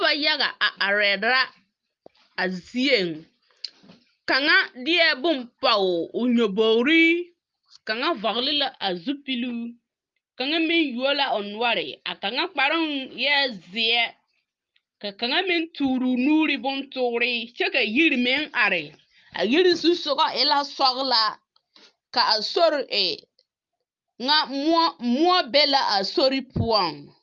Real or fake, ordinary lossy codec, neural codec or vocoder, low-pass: real; Opus, 32 kbps; none; 7.2 kHz